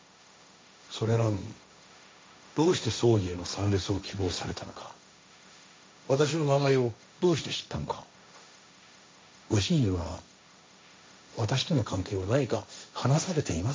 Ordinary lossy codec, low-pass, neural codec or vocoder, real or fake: none; none; codec, 16 kHz, 1.1 kbps, Voila-Tokenizer; fake